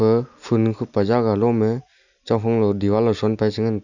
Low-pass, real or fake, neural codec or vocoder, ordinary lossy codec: 7.2 kHz; real; none; none